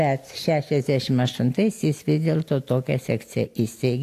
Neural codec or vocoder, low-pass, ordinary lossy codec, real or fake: none; 14.4 kHz; AAC, 64 kbps; real